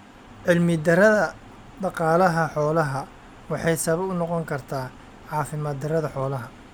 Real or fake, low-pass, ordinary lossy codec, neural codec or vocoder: real; none; none; none